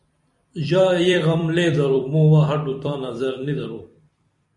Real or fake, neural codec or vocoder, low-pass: fake; vocoder, 44.1 kHz, 128 mel bands every 256 samples, BigVGAN v2; 10.8 kHz